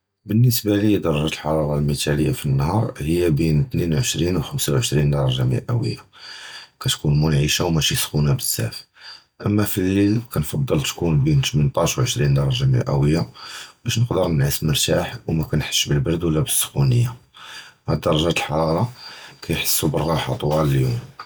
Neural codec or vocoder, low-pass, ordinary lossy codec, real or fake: none; none; none; real